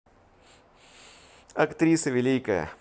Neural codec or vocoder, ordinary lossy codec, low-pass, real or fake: none; none; none; real